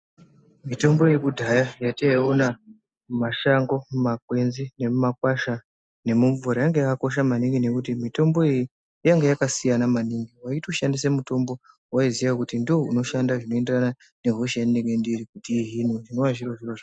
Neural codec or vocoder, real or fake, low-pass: none; real; 9.9 kHz